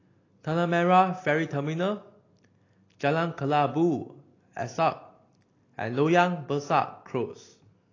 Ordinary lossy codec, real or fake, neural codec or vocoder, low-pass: AAC, 32 kbps; real; none; 7.2 kHz